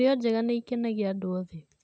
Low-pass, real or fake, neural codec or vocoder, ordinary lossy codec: none; real; none; none